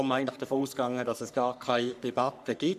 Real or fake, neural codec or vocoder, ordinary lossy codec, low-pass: fake; codec, 44.1 kHz, 3.4 kbps, Pupu-Codec; none; 14.4 kHz